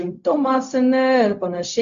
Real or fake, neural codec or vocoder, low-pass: fake; codec, 16 kHz, 0.4 kbps, LongCat-Audio-Codec; 7.2 kHz